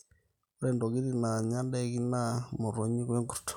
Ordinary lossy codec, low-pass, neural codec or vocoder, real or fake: none; 19.8 kHz; none; real